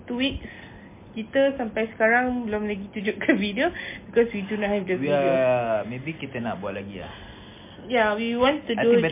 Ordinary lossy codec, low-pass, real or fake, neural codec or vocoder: MP3, 24 kbps; 3.6 kHz; real; none